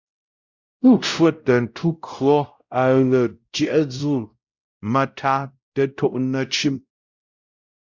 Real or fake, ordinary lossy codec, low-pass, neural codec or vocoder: fake; Opus, 64 kbps; 7.2 kHz; codec, 16 kHz, 0.5 kbps, X-Codec, WavLM features, trained on Multilingual LibriSpeech